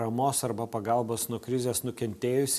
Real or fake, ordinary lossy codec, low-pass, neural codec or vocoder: real; AAC, 96 kbps; 14.4 kHz; none